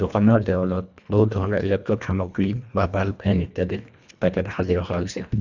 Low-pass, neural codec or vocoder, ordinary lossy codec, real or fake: 7.2 kHz; codec, 24 kHz, 1.5 kbps, HILCodec; none; fake